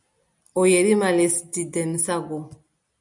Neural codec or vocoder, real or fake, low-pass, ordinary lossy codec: none; real; 10.8 kHz; MP3, 96 kbps